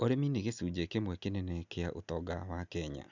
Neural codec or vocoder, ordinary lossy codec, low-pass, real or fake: none; none; 7.2 kHz; real